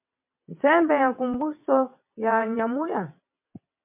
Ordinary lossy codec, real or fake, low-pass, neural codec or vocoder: MP3, 32 kbps; fake; 3.6 kHz; vocoder, 22.05 kHz, 80 mel bands, WaveNeXt